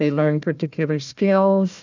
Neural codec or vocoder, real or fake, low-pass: codec, 16 kHz, 1 kbps, FunCodec, trained on Chinese and English, 50 frames a second; fake; 7.2 kHz